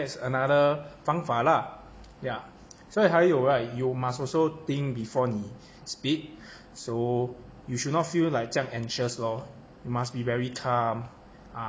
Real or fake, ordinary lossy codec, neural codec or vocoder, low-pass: real; none; none; none